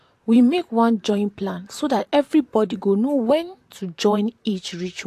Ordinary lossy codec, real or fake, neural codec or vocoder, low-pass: AAC, 64 kbps; fake; vocoder, 44.1 kHz, 128 mel bands, Pupu-Vocoder; 14.4 kHz